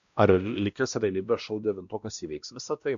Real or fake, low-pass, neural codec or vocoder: fake; 7.2 kHz; codec, 16 kHz, 1 kbps, X-Codec, WavLM features, trained on Multilingual LibriSpeech